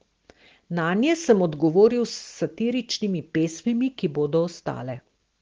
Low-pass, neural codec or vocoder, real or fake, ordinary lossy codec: 7.2 kHz; none; real; Opus, 16 kbps